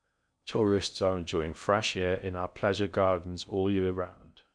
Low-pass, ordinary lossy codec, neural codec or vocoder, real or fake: 9.9 kHz; none; codec, 16 kHz in and 24 kHz out, 0.6 kbps, FocalCodec, streaming, 4096 codes; fake